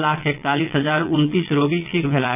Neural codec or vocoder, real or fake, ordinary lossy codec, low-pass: vocoder, 22.05 kHz, 80 mel bands, Vocos; fake; none; 3.6 kHz